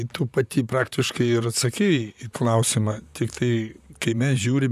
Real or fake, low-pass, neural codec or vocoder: fake; 14.4 kHz; vocoder, 44.1 kHz, 128 mel bands, Pupu-Vocoder